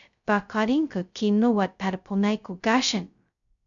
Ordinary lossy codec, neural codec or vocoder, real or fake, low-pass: MP3, 96 kbps; codec, 16 kHz, 0.2 kbps, FocalCodec; fake; 7.2 kHz